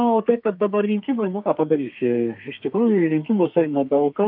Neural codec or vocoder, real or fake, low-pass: codec, 32 kHz, 1.9 kbps, SNAC; fake; 5.4 kHz